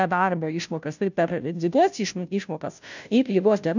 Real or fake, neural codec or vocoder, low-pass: fake; codec, 16 kHz, 0.5 kbps, FunCodec, trained on Chinese and English, 25 frames a second; 7.2 kHz